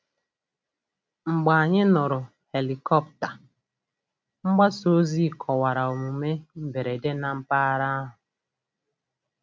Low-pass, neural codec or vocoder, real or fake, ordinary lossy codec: none; none; real; none